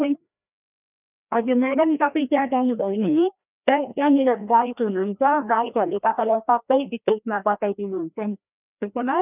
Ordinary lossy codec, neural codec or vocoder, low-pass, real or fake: none; codec, 16 kHz, 1 kbps, FreqCodec, larger model; 3.6 kHz; fake